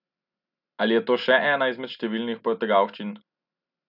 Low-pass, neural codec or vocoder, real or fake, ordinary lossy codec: 5.4 kHz; none; real; none